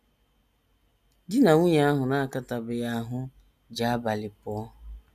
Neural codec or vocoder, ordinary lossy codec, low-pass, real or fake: none; none; 14.4 kHz; real